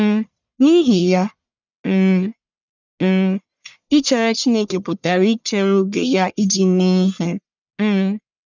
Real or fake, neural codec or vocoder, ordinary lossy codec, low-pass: fake; codec, 44.1 kHz, 1.7 kbps, Pupu-Codec; none; 7.2 kHz